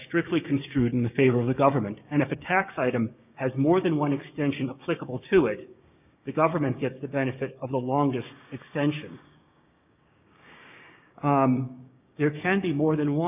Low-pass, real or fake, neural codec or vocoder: 3.6 kHz; fake; codec, 16 kHz, 6 kbps, DAC